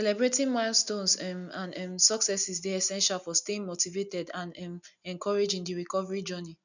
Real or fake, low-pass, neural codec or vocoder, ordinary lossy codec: real; 7.2 kHz; none; none